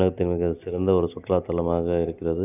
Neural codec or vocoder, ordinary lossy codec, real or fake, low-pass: none; none; real; 3.6 kHz